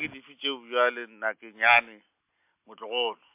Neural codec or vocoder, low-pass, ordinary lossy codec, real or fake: none; 3.6 kHz; MP3, 32 kbps; real